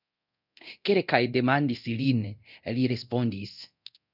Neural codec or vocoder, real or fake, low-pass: codec, 24 kHz, 0.9 kbps, DualCodec; fake; 5.4 kHz